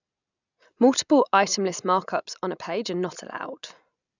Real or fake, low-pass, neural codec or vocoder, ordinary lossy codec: real; 7.2 kHz; none; none